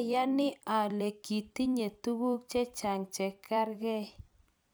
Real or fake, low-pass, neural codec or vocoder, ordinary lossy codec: fake; none; vocoder, 44.1 kHz, 128 mel bands every 256 samples, BigVGAN v2; none